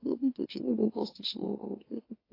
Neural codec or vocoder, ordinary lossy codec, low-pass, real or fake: autoencoder, 44.1 kHz, a latent of 192 numbers a frame, MeloTTS; AAC, 24 kbps; 5.4 kHz; fake